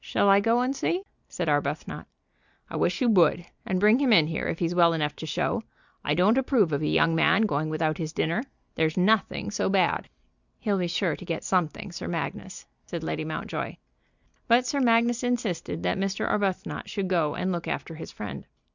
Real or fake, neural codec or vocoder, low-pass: real; none; 7.2 kHz